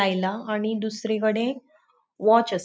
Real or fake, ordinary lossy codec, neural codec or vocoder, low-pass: real; none; none; none